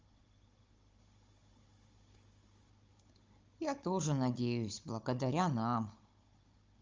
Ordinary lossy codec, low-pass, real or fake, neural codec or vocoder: Opus, 32 kbps; 7.2 kHz; fake; codec, 16 kHz, 16 kbps, FunCodec, trained on Chinese and English, 50 frames a second